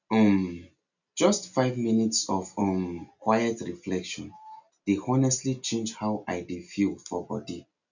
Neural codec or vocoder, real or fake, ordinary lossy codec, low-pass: none; real; none; 7.2 kHz